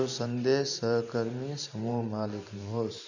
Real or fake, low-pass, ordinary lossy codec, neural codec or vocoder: fake; 7.2 kHz; none; vocoder, 44.1 kHz, 128 mel bands every 512 samples, BigVGAN v2